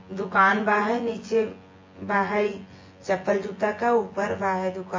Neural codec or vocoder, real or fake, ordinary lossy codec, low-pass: vocoder, 24 kHz, 100 mel bands, Vocos; fake; MP3, 32 kbps; 7.2 kHz